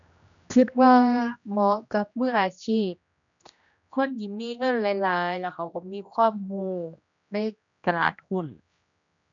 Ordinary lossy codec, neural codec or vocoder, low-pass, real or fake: none; codec, 16 kHz, 1 kbps, X-Codec, HuBERT features, trained on general audio; 7.2 kHz; fake